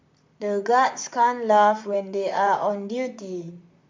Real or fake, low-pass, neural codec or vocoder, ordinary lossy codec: fake; 7.2 kHz; vocoder, 44.1 kHz, 128 mel bands, Pupu-Vocoder; MP3, 64 kbps